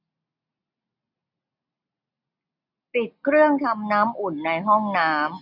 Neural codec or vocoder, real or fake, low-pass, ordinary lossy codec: none; real; 5.4 kHz; none